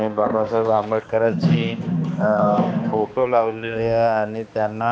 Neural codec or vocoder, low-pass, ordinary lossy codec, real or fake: codec, 16 kHz, 2 kbps, X-Codec, HuBERT features, trained on balanced general audio; none; none; fake